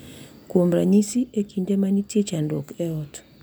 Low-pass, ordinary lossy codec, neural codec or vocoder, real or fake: none; none; none; real